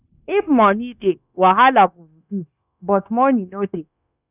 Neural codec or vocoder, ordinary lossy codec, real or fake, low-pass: codec, 16 kHz, 0.9 kbps, LongCat-Audio-Codec; none; fake; 3.6 kHz